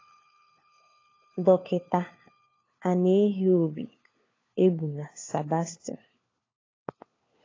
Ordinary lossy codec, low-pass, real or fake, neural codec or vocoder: AAC, 32 kbps; 7.2 kHz; fake; codec, 16 kHz, 4 kbps, FunCodec, trained on LibriTTS, 50 frames a second